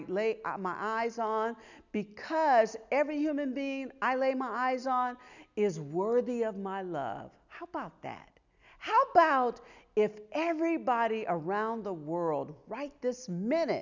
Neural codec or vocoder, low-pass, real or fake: none; 7.2 kHz; real